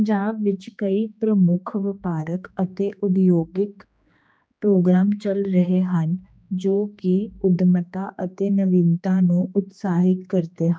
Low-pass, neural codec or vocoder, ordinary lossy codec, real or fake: none; codec, 16 kHz, 2 kbps, X-Codec, HuBERT features, trained on general audio; none; fake